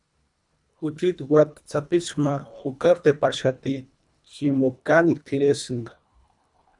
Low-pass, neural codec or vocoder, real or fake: 10.8 kHz; codec, 24 kHz, 1.5 kbps, HILCodec; fake